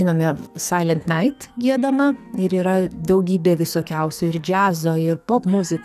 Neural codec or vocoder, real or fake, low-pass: codec, 44.1 kHz, 2.6 kbps, SNAC; fake; 14.4 kHz